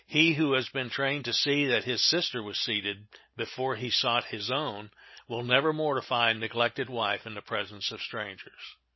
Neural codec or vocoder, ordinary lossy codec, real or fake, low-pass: none; MP3, 24 kbps; real; 7.2 kHz